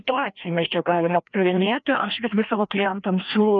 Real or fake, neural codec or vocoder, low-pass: fake; codec, 16 kHz, 1 kbps, FreqCodec, larger model; 7.2 kHz